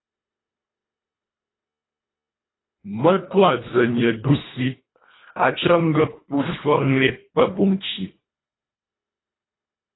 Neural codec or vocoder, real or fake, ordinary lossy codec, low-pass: codec, 24 kHz, 1.5 kbps, HILCodec; fake; AAC, 16 kbps; 7.2 kHz